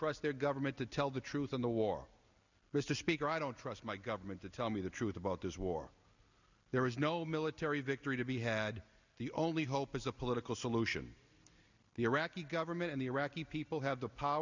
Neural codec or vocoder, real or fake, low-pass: none; real; 7.2 kHz